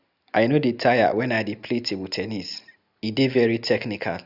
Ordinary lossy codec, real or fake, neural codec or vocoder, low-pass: none; real; none; 5.4 kHz